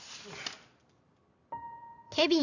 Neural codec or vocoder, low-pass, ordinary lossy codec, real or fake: none; 7.2 kHz; none; real